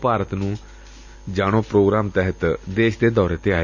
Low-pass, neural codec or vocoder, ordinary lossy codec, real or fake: 7.2 kHz; none; none; real